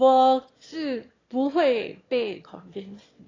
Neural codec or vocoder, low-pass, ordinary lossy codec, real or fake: autoencoder, 22.05 kHz, a latent of 192 numbers a frame, VITS, trained on one speaker; 7.2 kHz; AAC, 32 kbps; fake